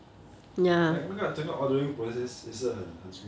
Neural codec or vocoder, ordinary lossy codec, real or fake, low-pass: none; none; real; none